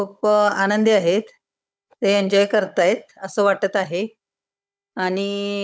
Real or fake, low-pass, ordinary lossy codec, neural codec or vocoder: fake; none; none; codec, 16 kHz, 16 kbps, FunCodec, trained on Chinese and English, 50 frames a second